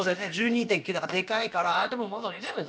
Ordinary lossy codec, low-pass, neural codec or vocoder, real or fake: none; none; codec, 16 kHz, about 1 kbps, DyCAST, with the encoder's durations; fake